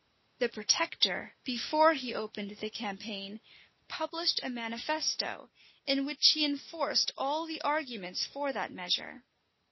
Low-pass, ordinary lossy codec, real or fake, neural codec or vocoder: 7.2 kHz; MP3, 24 kbps; real; none